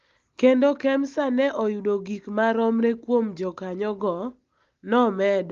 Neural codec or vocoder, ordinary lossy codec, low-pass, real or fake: none; Opus, 32 kbps; 7.2 kHz; real